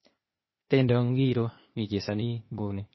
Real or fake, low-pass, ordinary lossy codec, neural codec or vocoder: fake; 7.2 kHz; MP3, 24 kbps; codec, 16 kHz, 0.8 kbps, ZipCodec